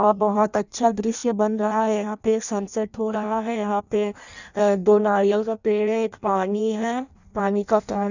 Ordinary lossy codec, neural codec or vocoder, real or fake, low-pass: none; codec, 16 kHz in and 24 kHz out, 0.6 kbps, FireRedTTS-2 codec; fake; 7.2 kHz